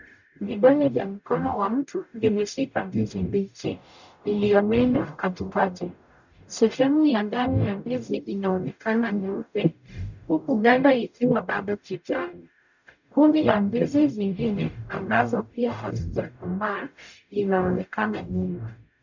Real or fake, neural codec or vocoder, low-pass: fake; codec, 44.1 kHz, 0.9 kbps, DAC; 7.2 kHz